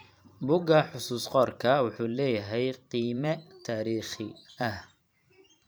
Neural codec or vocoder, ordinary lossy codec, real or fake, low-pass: vocoder, 44.1 kHz, 128 mel bands every 512 samples, BigVGAN v2; none; fake; none